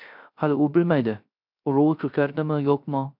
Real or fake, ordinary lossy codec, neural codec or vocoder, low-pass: fake; MP3, 48 kbps; codec, 16 kHz, 0.3 kbps, FocalCodec; 5.4 kHz